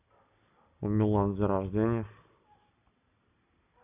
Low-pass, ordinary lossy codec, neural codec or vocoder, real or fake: 3.6 kHz; none; none; real